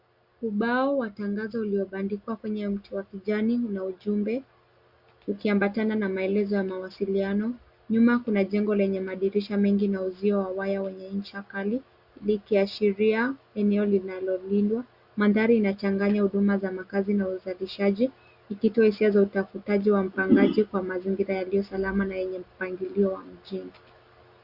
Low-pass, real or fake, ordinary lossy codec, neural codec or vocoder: 5.4 kHz; real; Opus, 64 kbps; none